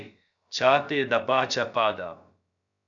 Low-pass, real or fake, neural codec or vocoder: 7.2 kHz; fake; codec, 16 kHz, about 1 kbps, DyCAST, with the encoder's durations